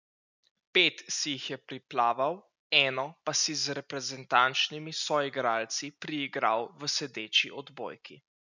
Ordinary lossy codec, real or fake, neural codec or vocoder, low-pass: none; real; none; 7.2 kHz